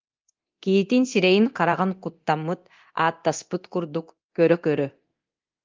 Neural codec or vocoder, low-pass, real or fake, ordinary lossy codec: codec, 24 kHz, 0.9 kbps, DualCodec; 7.2 kHz; fake; Opus, 32 kbps